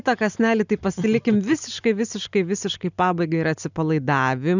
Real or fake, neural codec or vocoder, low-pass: real; none; 7.2 kHz